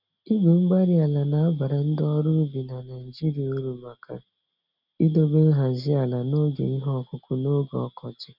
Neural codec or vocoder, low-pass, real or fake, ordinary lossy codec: none; 5.4 kHz; real; none